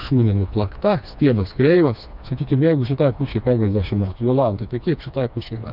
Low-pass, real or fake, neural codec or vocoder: 5.4 kHz; fake; codec, 16 kHz, 2 kbps, FreqCodec, smaller model